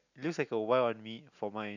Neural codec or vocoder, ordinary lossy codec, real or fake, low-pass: none; none; real; 7.2 kHz